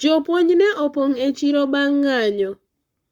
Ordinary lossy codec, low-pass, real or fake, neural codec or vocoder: Opus, 64 kbps; 19.8 kHz; fake; codec, 44.1 kHz, 7.8 kbps, Pupu-Codec